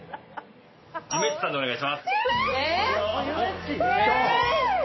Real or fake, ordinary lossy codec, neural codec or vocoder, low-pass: real; MP3, 24 kbps; none; 7.2 kHz